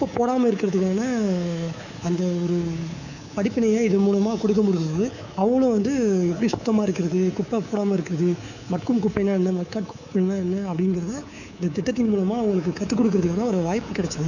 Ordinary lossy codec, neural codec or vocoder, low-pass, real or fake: none; codec, 24 kHz, 3.1 kbps, DualCodec; 7.2 kHz; fake